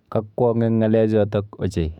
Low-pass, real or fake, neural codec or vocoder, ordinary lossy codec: 19.8 kHz; fake; autoencoder, 48 kHz, 128 numbers a frame, DAC-VAE, trained on Japanese speech; none